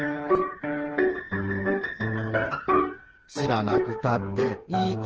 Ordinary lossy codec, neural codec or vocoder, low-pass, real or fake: Opus, 16 kbps; codec, 16 kHz, 4 kbps, FreqCodec, smaller model; 7.2 kHz; fake